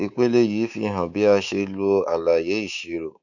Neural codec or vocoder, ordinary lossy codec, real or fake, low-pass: codec, 24 kHz, 3.1 kbps, DualCodec; none; fake; 7.2 kHz